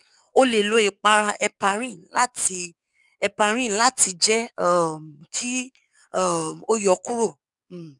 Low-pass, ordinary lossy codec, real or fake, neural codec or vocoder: 10.8 kHz; none; fake; codec, 44.1 kHz, 7.8 kbps, DAC